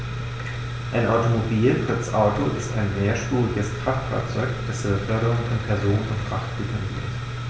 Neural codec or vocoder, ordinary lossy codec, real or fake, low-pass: none; none; real; none